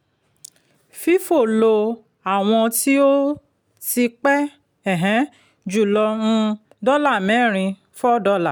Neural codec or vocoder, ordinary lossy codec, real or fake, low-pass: none; none; real; none